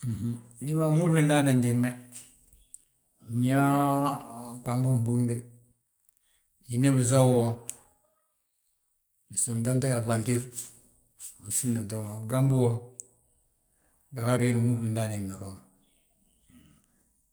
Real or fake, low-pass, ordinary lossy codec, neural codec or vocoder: fake; none; none; codec, 44.1 kHz, 2.6 kbps, SNAC